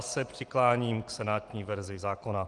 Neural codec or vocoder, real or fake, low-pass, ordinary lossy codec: none; real; 10.8 kHz; Opus, 24 kbps